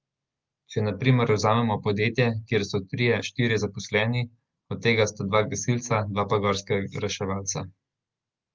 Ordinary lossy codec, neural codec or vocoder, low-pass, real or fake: Opus, 32 kbps; none; 7.2 kHz; real